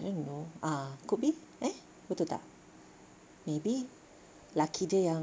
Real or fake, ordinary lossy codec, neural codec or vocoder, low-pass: real; none; none; none